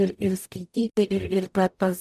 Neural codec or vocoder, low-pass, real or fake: codec, 44.1 kHz, 0.9 kbps, DAC; 14.4 kHz; fake